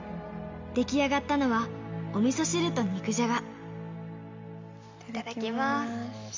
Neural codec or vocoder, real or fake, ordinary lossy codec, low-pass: none; real; MP3, 64 kbps; 7.2 kHz